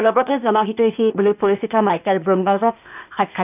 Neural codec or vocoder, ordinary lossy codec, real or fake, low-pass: codec, 16 kHz, 0.8 kbps, ZipCodec; none; fake; 3.6 kHz